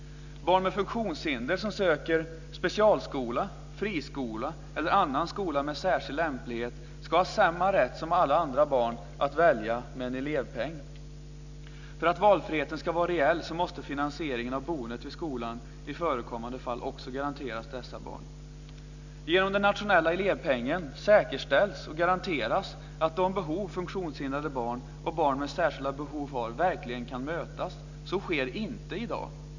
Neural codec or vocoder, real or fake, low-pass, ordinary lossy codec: none; real; 7.2 kHz; none